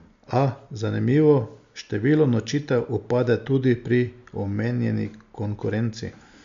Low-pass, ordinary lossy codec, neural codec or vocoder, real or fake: 7.2 kHz; MP3, 64 kbps; none; real